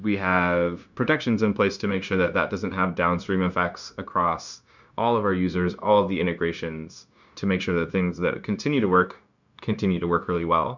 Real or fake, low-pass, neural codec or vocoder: fake; 7.2 kHz; codec, 16 kHz, 0.9 kbps, LongCat-Audio-Codec